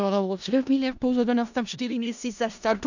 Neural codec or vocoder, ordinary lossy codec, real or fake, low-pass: codec, 16 kHz in and 24 kHz out, 0.4 kbps, LongCat-Audio-Codec, four codebook decoder; none; fake; 7.2 kHz